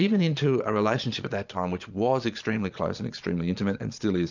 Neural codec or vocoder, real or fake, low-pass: vocoder, 22.05 kHz, 80 mel bands, Vocos; fake; 7.2 kHz